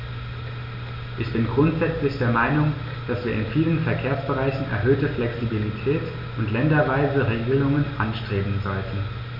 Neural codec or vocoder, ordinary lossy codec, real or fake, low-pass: none; MP3, 48 kbps; real; 5.4 kHz